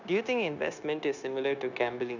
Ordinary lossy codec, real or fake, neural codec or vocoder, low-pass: none; fake; codec, 16 kHz, 0.9 kbps, LongCat-Audio-Codec; 7.2 kHz